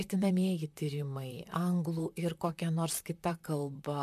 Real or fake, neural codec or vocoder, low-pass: fake; vocoder, 44.1 kHz, 128 mel bands, Pupu-Vocoder; 14.4 kHz